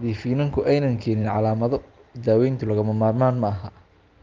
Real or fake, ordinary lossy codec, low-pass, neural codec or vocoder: real; Opus, 32 kbps; 7.2 kHz; none